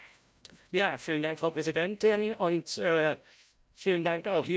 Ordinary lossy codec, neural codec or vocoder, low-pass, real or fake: none; codec, 16 kHz, 0.5 kbps, FreqCodec, larger model; none; fake